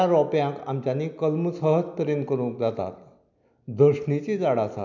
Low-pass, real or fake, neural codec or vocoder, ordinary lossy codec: 7.2 kHz; real; none; none